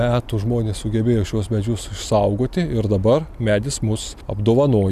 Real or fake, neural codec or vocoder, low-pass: real; none; 14.4 kHz